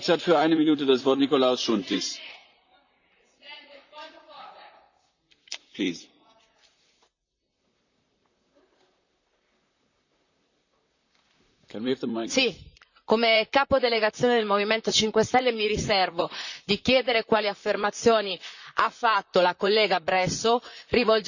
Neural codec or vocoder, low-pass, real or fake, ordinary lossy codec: vocoder, 44.1 kHz, 128 mel bands, Pupu-Vocoder; 7.2 kHz; fake; none